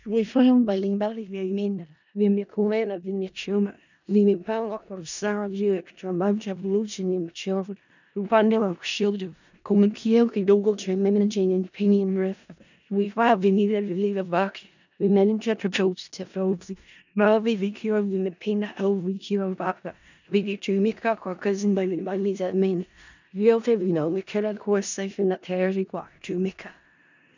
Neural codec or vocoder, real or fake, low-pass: codec, 16 kHz in and 24 kHz out, 0.4 kbps, LongCat-Audio-Codec, four codebook decoder; fake; 7.2 kHz